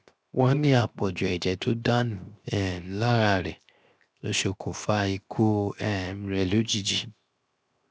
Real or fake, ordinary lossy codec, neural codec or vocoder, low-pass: fake; none; codec, 16 kHz, 0.7 kbps, FocalCodec; none